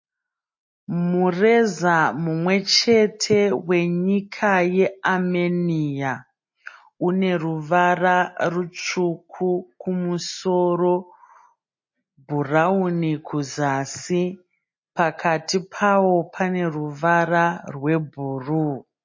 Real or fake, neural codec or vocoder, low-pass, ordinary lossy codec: real; none; 7.2 kHz; MP3, 32 kbps